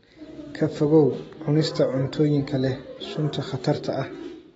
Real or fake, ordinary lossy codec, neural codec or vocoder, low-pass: real; AAC, 24 kbps; none; 19.8 kHz